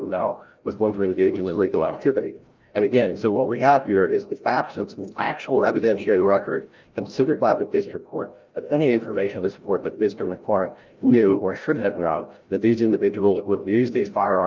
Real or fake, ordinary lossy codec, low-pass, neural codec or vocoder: fake; Opus, 24 kbps; 7.2 kHz; codec, 16 kHz, 0.5 kbps, FreqCodec, larger model